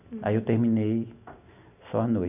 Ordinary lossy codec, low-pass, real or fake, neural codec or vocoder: none; 3.6 kHz; real; none